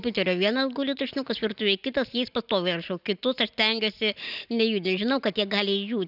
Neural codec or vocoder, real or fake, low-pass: none; real; 5.4 kHz